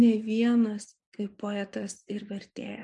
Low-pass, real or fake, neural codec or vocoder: 10.8 kHz; fake; vocoder, 24 kHz, 100 mel bands, Vocos